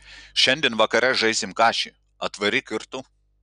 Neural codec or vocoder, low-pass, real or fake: none; 9.9 kHz; real